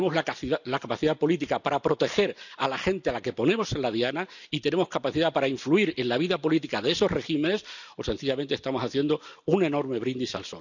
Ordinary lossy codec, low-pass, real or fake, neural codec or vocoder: none; 7.2 kHz; real; none